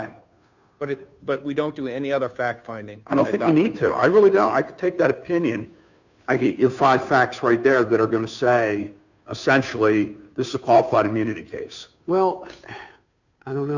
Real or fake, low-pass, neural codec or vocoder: fake; 7.2 kHz; codec, 16 kHz, 2 kbps, FunCodec, trained on Chinese and English, 25 frames a second